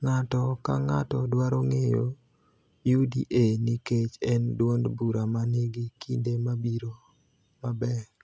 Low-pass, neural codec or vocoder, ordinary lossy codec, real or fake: none; none; none; real